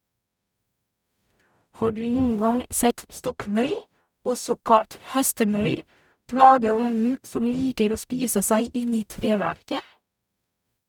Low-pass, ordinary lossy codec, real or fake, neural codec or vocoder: 19.8 kHz; none; fake; codec, 44.1 kHz, 0.9 kbps, DAC